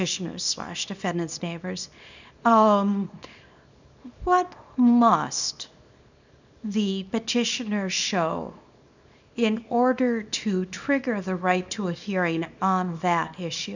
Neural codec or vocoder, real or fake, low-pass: codec, 24 kHz, 0.9 kbps, WavTokenizer, small release; fake; 7.2 kHz